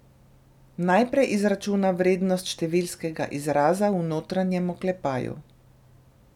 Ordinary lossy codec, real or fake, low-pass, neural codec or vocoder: none; real; 19.8 kHz; none